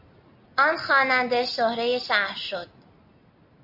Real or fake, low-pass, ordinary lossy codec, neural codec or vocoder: real; 5.4 kHz; MP3, 48 kbps; none